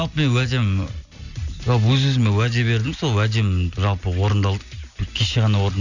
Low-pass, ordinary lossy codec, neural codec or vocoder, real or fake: 7.2 kHz; none; none; real